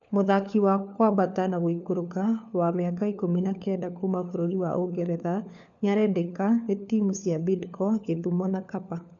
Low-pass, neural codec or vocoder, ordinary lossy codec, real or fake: 7.2 kHz; codec, 16 kHz, 4 kbps, FunCodec, trained on LibriTTS, 50 frames a second; none; fake